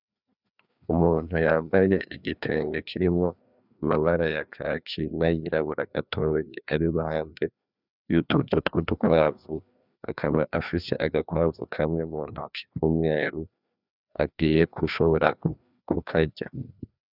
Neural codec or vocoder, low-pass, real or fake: codec, 16 kHz, 2 kbps, FreqCodec, larger model; 5.4 kHz; fake